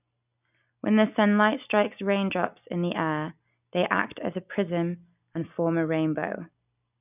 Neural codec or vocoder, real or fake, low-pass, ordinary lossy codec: none; real; 3.6 kHz; none